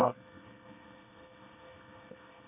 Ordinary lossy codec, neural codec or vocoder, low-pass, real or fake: none; codec, 24 kHz, 1 kbps, SNAC; 3.6 kHz; fake